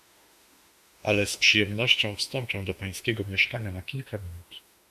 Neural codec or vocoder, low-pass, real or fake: autoencoder, 48 kHz, 32 numbers a frame, DAC-VAE, trained on Japanese speech; 14.4 kHz; fake